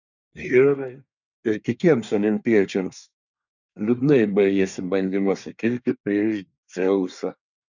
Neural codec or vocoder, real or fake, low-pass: codec, 24 kHz, 1 kbps, SNAC; fake; 7.2 kHz